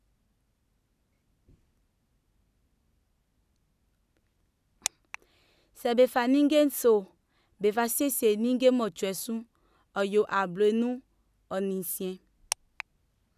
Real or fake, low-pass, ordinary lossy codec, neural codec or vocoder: real; 14.4 kHz; none; none